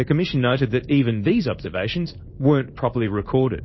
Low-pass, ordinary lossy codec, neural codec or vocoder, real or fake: 7.2 kHz; MP3, 24 kbps; codec, 16 kHz in and 24 kHz out, 1 kbps, XY-Tokenizer; fake